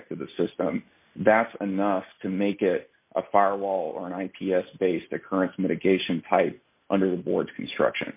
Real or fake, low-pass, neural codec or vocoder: real; 3.6 kHz; none